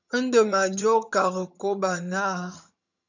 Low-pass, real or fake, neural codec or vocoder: 7.2 kHz; fake; vocoder, 22.05 kHz, 80 mel bands, HiFi-GAN